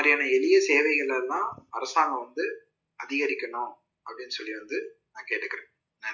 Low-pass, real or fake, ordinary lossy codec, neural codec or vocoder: 7.2 kHz; real; none; none